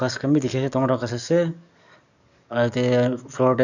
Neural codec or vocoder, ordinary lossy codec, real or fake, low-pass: codec, 44.1 kHz, 7.8 kbps, DAC; none; fake; 7.2 kHz